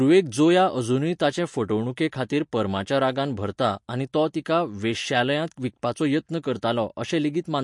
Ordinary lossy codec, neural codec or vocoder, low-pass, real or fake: MP3, 48 kbps; none; 10.8 kHz; real